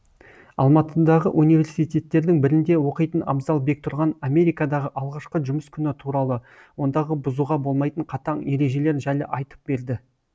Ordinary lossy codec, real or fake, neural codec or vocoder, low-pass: none; real; none; none